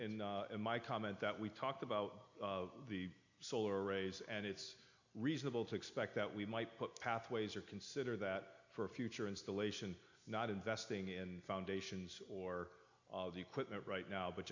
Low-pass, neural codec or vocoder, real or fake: 7.2 kHz; none; real